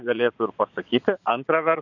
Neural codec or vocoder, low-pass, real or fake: vocoder, 22.05 kHz, 80 mel bands, Vocos; 7.2 kHz; fake